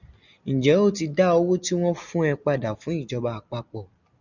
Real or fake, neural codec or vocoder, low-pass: real; none; 7.2 kHz